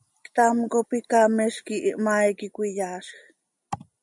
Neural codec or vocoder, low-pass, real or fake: none; 10.8 kHz; real